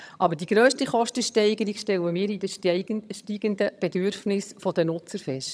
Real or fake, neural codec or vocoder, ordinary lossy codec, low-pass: fake; vocoder, 22.05 kHz, 80 mel bands, HiFi-GAN; none; none